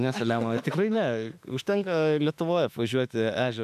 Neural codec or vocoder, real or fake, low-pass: autoencoder, 48 kHz, 32 numbers a frame, DAC-VAE, trained on Japanese speech; fake; 14.4 kHz